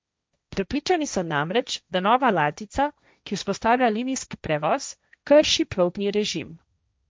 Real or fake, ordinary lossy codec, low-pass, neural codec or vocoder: fake; none; none; codec, 16 kHz, 1.1 kbps, Voila-Tokenizer